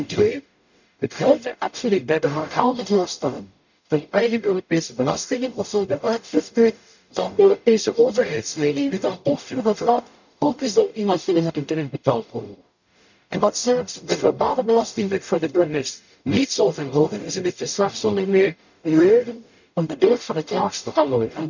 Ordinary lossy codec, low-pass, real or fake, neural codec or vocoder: none; 7.2 kHz; fake; codec, 44.1 kHz, 0.9 kbps, DAC